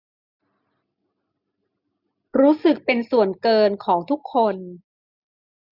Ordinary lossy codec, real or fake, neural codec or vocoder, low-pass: Opus, 64 kbps; real; none; 5.4 kHz